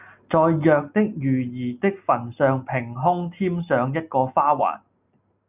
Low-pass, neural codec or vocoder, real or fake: 3.6 kHz; none; real